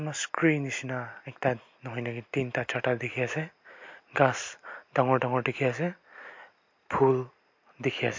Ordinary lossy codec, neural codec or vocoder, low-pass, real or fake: MP3, 48 kbps; none; 7.2 kHz; real